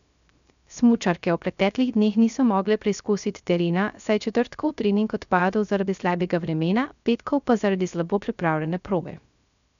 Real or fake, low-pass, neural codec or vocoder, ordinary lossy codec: fake; 7.2 kHz; codec, 16 kHz, 0.3 kbps, FocalCodec; none